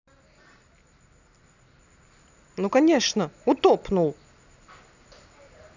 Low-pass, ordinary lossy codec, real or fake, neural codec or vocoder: 7.2 kHz; none; real; none